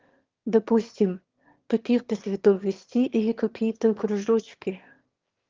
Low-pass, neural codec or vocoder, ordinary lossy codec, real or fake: 7.2 kHz; autoencoder, 22.05 kHz, a latent of 192 numbers a frame, VITS, trained on one speaker; Opus, 16 kbps; fake